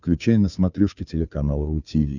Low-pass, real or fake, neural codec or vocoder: 7.2 kHz; fake; codec, 16 kHz, 2 kbps, FunCodec, trained on Chinese and English, 25 frames a second